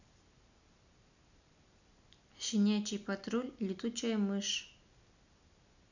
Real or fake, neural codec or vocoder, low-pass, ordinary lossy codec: real; none; 7.2 kHz; none